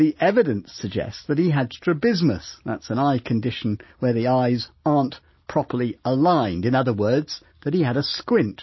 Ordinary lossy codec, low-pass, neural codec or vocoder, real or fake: MP3, 24 kbps; 7.2 kHz; none; real